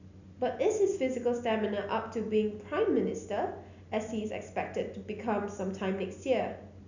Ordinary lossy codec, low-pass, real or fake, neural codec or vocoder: none; 7.2 kHz; real; none